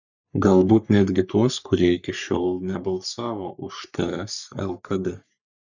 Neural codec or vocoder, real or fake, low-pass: codec, 44.1 kHz, 3.4 kbps, Pupu-Codec; fake; 7.2 kHz